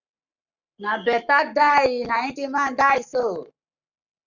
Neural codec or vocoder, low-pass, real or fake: codec, 44.1 kHz, 7.8 kbps, Pupu-Codec; 7.2 kHz; fake